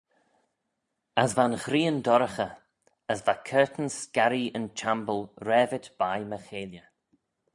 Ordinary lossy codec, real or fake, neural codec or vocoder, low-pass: MP3, 96 kbps; real; none; 10.8 kHz